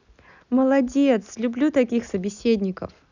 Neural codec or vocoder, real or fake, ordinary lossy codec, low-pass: none; real; none; 7.2 kHz